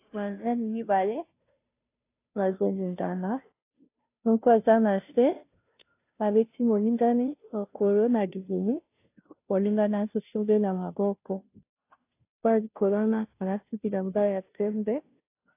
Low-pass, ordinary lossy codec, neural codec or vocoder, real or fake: 3.6 kHz; AAC, 24 kbps; codec, 16 kHz, 0.5 kbps, FunCodec, trained on Chinese and English, 25 frames a second; fake